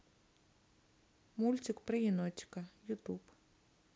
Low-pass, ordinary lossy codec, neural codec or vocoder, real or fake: none; none; none; real